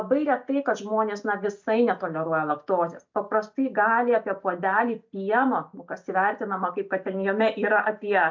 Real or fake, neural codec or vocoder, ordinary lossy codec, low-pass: real; none; MP3, 64 kbps; 7.2 kHz